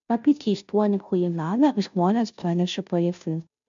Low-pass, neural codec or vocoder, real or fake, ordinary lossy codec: 7.2 kHz; codec, 16 kHz, 0.5 kbps, FunCodec, trained on Chinese and English, 25 frames a second; fake; MP3, 64 kbps